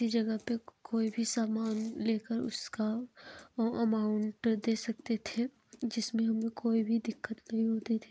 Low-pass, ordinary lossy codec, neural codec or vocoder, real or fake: none; none; none; real